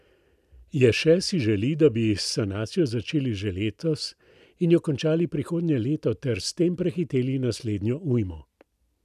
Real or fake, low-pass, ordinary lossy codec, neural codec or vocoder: real; 14.4 kHz; none; none